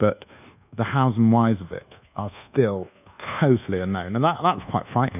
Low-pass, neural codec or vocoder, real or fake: 3.6 kHz; codec, 24 kHz, 1.2 kbps, DualCodec; fake